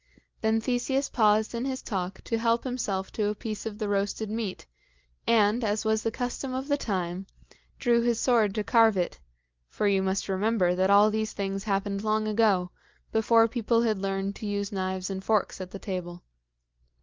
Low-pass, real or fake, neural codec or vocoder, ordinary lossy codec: 7.2 kHz; real; none; Opus, 24 kbps